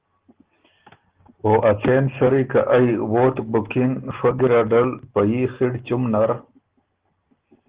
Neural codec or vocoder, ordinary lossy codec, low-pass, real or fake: none; Opus, 16 kbps; 3.6 kHz; real